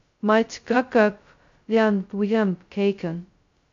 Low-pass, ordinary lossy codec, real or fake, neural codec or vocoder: 7.2 kHz; AAC, 64 kbps; fake; codec, 16 kHz, 0.2 kbps, FocalCodec